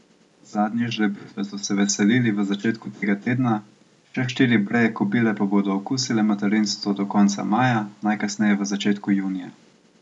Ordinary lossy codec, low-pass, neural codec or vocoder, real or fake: none; 10.8 kHz; none; real